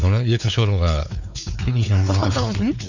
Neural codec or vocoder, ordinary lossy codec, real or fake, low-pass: codec, 16 kHz, 4 kbps, X-Codec, WavLM features, trained on Multilingual LibriSpeech; none; fake; 7.2 kHz